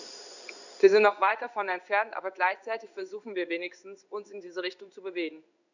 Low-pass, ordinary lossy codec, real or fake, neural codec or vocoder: 7.2 kHz; none; fake; codec, 16 kHz in and 24 kHz out, 1 kbps, XY-Tokenizer